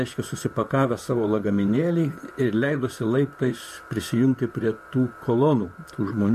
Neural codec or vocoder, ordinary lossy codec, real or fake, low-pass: vocoder, 44.1 kHz, 128 mel bands, Pupu-Vocoder; MP3, 64 kbps; fake; 14.4 kHz